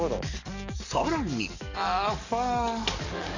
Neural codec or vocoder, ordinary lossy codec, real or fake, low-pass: codec, 44.1 kHz, 7.8 kbps, DAC; none; fake; 7.2 kHz